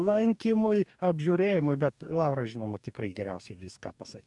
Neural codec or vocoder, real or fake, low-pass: codec, 44.1 kHz, 2.6 kbps, DAC; fake; 10.8 kHz